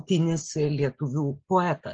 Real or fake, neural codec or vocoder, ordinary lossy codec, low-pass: real; none; Opus, 24 kbps; 7.2 kHz